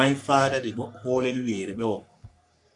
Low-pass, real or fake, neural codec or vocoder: 10.8 kHz; fake; codec, 44.1 kHz, 3.4 kbps, Pupu-Codec